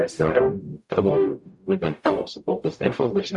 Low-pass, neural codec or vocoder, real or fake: 10.8 kHz; codec, 44.1 kHz, 0.9 kbps, DAC; fake